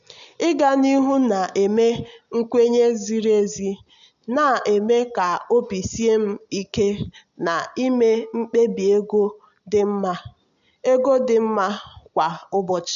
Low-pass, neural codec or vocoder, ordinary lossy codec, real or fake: 7.2 kHz; none; none; real